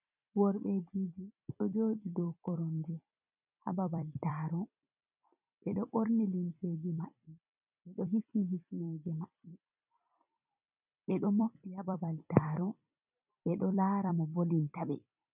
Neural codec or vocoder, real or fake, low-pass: vocoder, 44.1 kHz, 128 mel bands every 256 samples, BigVGAN v2; fake; 3.6 kHz